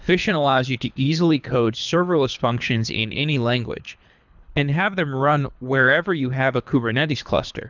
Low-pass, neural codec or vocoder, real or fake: 7.2 kHz; codec, 24 kHz, 3 kbps, HILCodec; fake